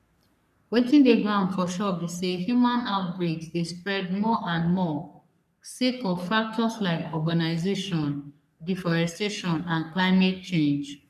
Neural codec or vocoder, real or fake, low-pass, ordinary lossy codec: codec, 44.1 kHz, 3.4 kbps, Pupu-Codec; fake; 14.4 kHz; none